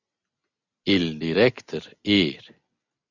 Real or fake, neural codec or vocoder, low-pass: real; none; 7.2 kHz